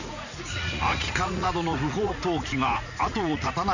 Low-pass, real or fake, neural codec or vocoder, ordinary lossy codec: 7.2 kHz; fake; vocoder, 44.1 kHz, 80 mel bands, Vocos; none